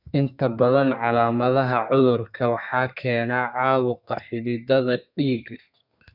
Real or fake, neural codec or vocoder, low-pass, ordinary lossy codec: fake; codec, 32 kHz, 1.9 kbps, SNAC; 5.4 kHz; none